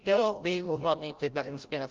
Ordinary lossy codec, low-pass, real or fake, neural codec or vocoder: Opus, 32 kbps; 7.2 kHz; fake; codec, 16 kHz, 0.5 kbps, FreqCodec, larger model